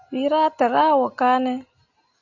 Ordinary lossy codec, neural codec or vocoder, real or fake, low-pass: AAC, 48 kbps; none; real; 7.2 kHz